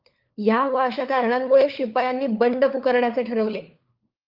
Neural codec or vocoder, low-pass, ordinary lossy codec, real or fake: codec, 16 kHz, 4 kbps, FunCodec, trained on LibriTTS, 50 frames a second; 5.4 kHz; Opus, 32 kbps; fake